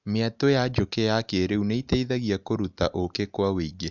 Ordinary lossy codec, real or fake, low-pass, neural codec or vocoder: none; real; 7.2 kHz; none